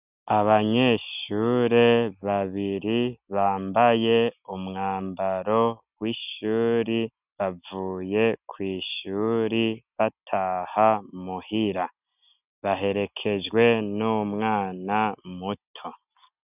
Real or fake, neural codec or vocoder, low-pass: real; none; 3.6 kHz